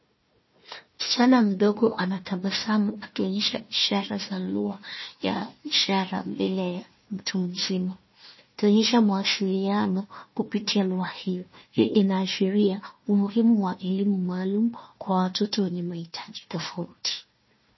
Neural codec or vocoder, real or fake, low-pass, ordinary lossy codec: codec, 16 kHz, 1 kbps, FunCodec, trained on Chinese and English, 50 frames a second; fake; 7.2 kHz; MP3, 24 kbps